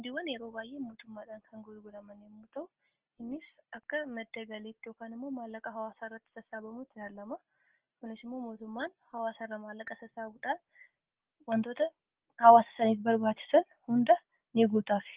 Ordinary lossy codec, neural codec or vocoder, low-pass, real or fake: Opus, 16 kbps; none; 3.6 kHz; real